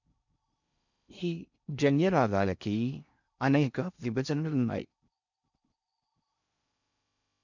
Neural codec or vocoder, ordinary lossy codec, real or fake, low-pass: codec, 16 kHz in and 24 kHz out, 0.6 kbps, FocalCodec, streaming, 4096 codes; none; fake; 7.2 kHz